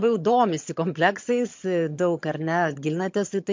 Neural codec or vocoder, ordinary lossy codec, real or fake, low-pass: vocoder, 22.05 kHz, 80 mel bands, HiFi-GAN; MP3, 48 kbps; fake; 7.2 kHz